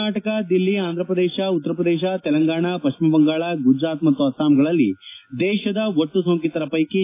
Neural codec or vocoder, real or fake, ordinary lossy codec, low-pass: none; real; AAC, 24 kbps; 3.6 kHz